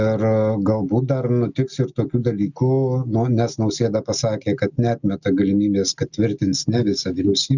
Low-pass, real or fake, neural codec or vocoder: 7.2 kHz; real; none